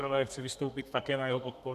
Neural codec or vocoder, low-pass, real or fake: codec, 32 kHz, 1.9 kbps, SNAC; 14.4 kHz; fake